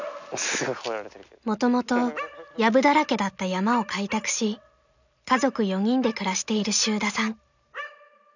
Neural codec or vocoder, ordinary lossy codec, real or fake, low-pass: none; none; real; 7.2 kHz